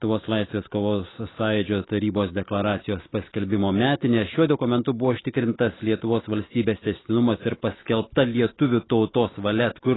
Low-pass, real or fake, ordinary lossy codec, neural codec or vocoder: 7.2 kHz; real; AAC, 16 kbps; none